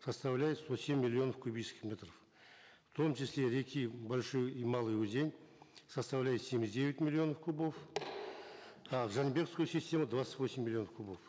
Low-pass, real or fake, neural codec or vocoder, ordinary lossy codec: none; real; none; none